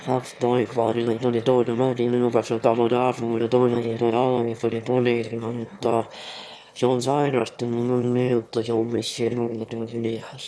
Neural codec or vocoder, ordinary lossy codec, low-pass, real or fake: autoencoder, 22.05 kHz, a latent of 192 numbers a frame, VITS, trained on one speaker; none; none; fake